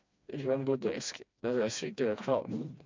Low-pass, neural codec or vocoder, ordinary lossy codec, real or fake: 7.2 kHz; codec, 16 kHz, 2 kbps, FreqCodec, smaller model; MP3, 64 kbps; fake